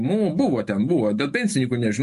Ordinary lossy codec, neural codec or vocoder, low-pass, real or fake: MP3, 48 kbps; autoencoder, 48 kHz, 128 numbers a frame, DAC-VAE, trained on Japanese speech; 14.4 kHz; fake